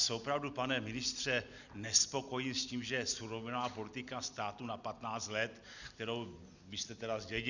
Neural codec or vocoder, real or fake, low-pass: none; real; 7.2 kHz